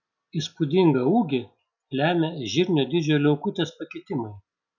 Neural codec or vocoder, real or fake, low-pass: none; real; 7.2 kHz